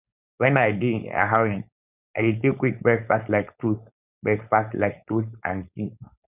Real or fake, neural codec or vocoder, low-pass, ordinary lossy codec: fake; codec, 16 kHz, 4.8 kbps, FACodec; 3.6 kHz; none